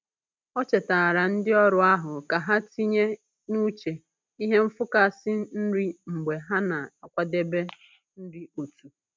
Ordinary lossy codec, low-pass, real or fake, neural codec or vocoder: none; none; real; none